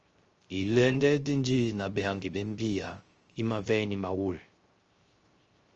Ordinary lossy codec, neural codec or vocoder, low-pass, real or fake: Opus, 24 kbps; codec, 16 kHz, 0.3 kbps, FocalCodec; 7.2 kHz; fake